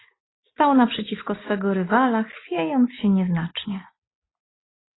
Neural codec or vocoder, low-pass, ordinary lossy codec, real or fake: none; 7.2 kHz; AAC, 16 kbps; real